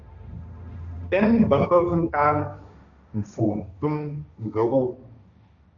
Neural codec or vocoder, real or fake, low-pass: codec, 16 kHz, 1.1 kbps, Voila-Tokenizer; fake; 7.2 kHz